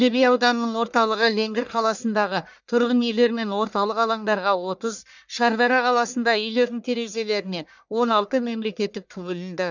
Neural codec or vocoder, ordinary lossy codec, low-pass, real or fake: codec, 44.1 kHz, 1.7 kbps, Pupu-Codec; none; 7.2 kHz; fake